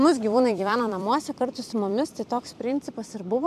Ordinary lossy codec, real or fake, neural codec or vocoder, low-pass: MP3, 96 kbps; fake; vocoder, 44.1 kHz, 128 mel bands every 256 samples, BigVGAN v2; 14.4 kHz